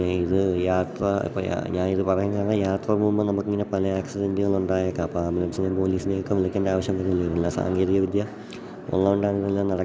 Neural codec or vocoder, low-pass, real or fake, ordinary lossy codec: none; none; real; none